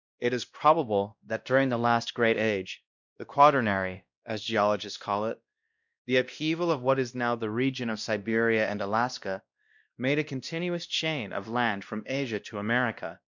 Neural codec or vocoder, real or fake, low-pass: codec, 16 kHz, 1 kbps, X-Codec, WavLM features, trained on Multilingual LibriSpeech; fake; 7.2 kHz